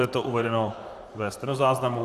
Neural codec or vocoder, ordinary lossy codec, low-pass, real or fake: vocoder, 44.1 kHz, 128 mel bands, Pupu-Vocoder; Opus, 64 kbps; 14.4 kHz; fake